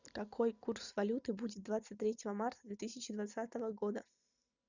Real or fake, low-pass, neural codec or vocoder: real; 7.2 kHz; none